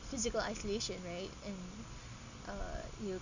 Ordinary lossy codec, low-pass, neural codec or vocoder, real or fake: none; 7.2 kHz; none; real